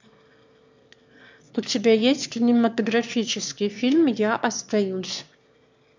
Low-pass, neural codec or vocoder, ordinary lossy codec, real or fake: 7.2 kHz; autoencoder, 22.05 kHz, a latent of 192 numbers a frame, VITS, trained on one speaker; MP3, 64 kbps; fake